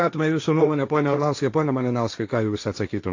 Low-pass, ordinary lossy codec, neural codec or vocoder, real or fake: 7.2 kHz; AAC, 48 kbps; codec, 16 kHz, 1.1 kbps, Voila-Tokenizer; fake